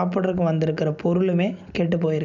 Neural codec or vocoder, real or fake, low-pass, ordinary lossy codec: none; real; 7.2 kHz; none